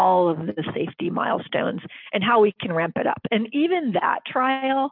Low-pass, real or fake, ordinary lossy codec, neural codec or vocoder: 5.4 kHz; real; MP3, 48 kbps; none